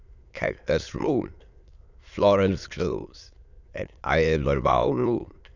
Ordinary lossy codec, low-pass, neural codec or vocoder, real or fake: none; 7.2 kHz; autoencoder, 22.05 kHz, a latent of 192 numbers a frame, VITS, trained on many speakers; fake